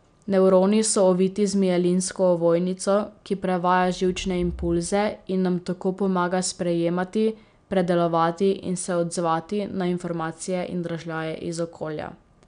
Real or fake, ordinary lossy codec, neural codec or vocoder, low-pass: real; MP3, 96 kbps; none; 9.9 kHz